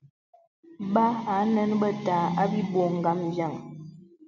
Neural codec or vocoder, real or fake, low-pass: none; real; 7.2 kHz